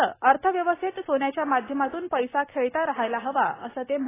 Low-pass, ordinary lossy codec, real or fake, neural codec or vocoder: 3.6 kHz; AAC, 16 kbps; real; none